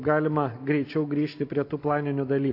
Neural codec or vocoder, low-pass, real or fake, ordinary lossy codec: none; 5.4 kHz; real; AAC, 32 kbps